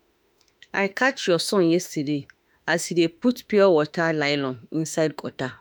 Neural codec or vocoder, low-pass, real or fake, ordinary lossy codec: autoencoder, 48 kHz, 32 numbers a frame, DAC-VAE, trained on Japanese speech; none; fake; none